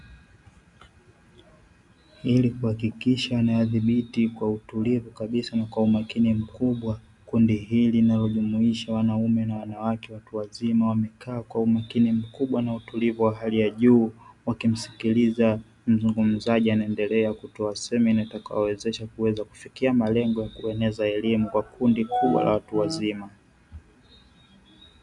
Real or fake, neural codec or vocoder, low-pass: real; none; 10.8 kHz